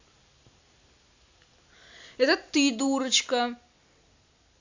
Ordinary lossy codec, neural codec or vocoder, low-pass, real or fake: AAC, 48 kbps; none; 7.2 kHz; real